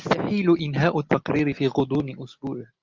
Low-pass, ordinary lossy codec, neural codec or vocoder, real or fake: 7.2 kHz; Opus, 24 kbps; none; real